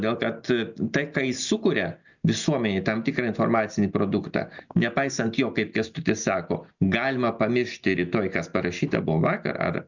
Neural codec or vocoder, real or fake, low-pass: none; real; 7.2 kHz